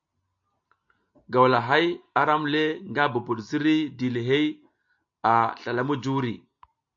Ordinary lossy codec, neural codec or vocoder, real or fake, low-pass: AAC, 64 kbps; none; real; 7.2 kHz